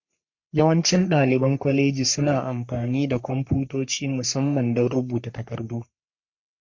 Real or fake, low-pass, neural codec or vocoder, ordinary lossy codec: fake; 7.2 kHz; codec, 44.1 kHz, 3.4 kbps, Pupu-Codec; MP3, 48 kbps